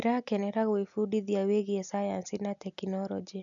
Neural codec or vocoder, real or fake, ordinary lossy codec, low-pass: none; real; none; 7.2 kHz